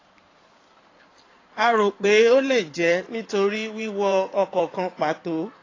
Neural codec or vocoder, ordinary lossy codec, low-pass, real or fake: codec, 16 kHz in and 24 kHz out, 2.2 kbps, FireRedTTS-2 codec; AAC, 32 kbps; 7.2 kHz; fake